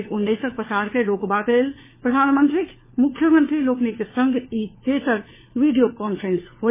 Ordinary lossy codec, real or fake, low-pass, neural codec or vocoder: MP3, 16 kbps; fake; 3.6 kHz; codec, 16 kHz, 4 kbps, FunCodec, trained on LibriTTS, 50 frames a second